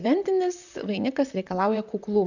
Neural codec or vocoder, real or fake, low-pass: vocoder, 44.1 kHz, 128 mel bands, Pupu-Vocoder; fake; 7.2 kHz